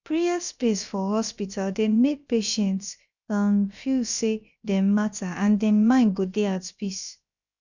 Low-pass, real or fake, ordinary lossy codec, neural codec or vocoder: 7.2 kHz; fake; none; codec, 16 kHz, about 1 kbps, DyCAST, with the encoder's durations